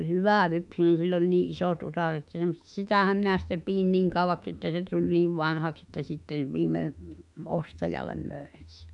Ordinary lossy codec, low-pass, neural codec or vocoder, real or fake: none; 10.8 kHz; autoencoder, 48 kHz, 32 numbers a frame, DAC-VAE, trained on Japanese speech; fake